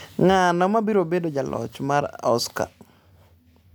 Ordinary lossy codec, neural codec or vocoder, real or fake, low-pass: none; none; real; none